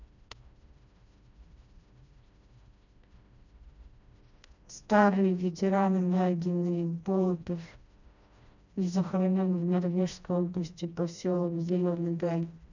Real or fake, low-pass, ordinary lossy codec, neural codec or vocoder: fake; 7.2 kHz; none; codec, 16 kHz, 1 kbps, FreqCodec, smaller model